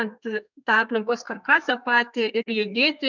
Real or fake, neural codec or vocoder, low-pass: fake; codec, 32 kHz, 1.9 kbps, SNAC; 7.2 kHz